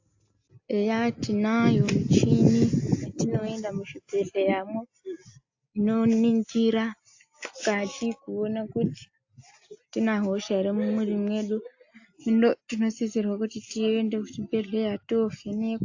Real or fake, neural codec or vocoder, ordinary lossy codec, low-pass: real; none; AAC, 48 kbps; 7.2 kHz